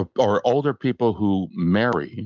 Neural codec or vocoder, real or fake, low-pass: none; real; 7.2 kHz